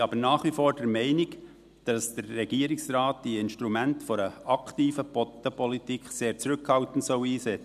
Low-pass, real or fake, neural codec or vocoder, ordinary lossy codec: 14.4 kHz; real; none; none